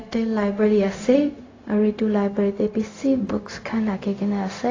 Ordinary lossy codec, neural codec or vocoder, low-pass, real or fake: AAC, 32 kbps; codec, 16 kHz, 0.4 kbps, LongCat-Audio-Codec; 7.2 kHz; fake